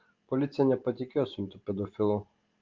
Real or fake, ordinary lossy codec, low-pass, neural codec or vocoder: fake; Opus, 32 kbps; 7.2 kHz; vocoder, 44.1 kHz, 128 mel bands every 512 samples, BigVGAN v2